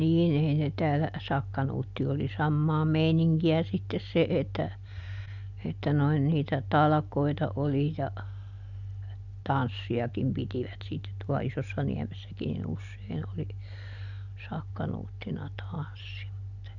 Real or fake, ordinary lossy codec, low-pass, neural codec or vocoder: real; none; 7.2 kHz; none